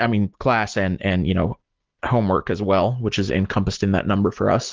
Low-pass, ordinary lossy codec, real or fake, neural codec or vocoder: 7.2 kHz; Opus, 16 kbps; real; none